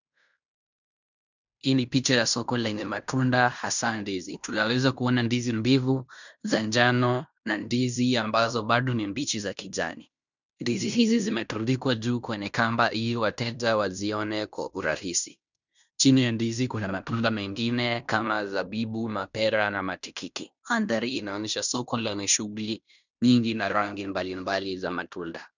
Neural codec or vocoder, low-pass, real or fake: codec, 16 kHz in and 24 kHz out, 0.9 kbps, LongCat-Audio-Codec, fine tuned four codebook decoder; 7.2 kHz; fake